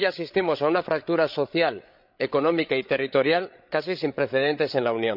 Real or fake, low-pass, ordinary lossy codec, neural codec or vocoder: fake; 5.4 kHz; none; codec, 16 kHz, 8 kbps, FreqCodec, larger model